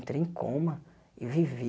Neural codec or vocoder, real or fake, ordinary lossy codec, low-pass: none; real; none; none